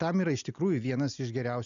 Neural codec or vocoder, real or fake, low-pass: none; real; 7.2 kHz